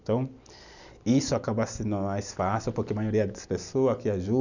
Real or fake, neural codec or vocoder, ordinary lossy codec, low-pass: real; none; none; 7.2 kHz